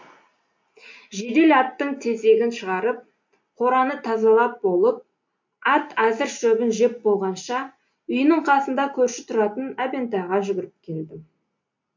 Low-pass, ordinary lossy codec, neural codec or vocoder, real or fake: 7.2 kHz; MP3, 48 kbps; none; real